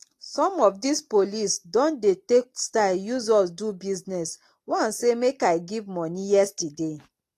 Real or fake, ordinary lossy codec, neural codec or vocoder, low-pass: real; AAC, 48 kbps; none; 14.4 kHz